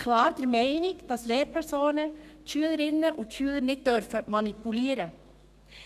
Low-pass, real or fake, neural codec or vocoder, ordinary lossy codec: 14.4 kHz; fake; codec, 32 kHz, 1.9 kbps, SNAC; none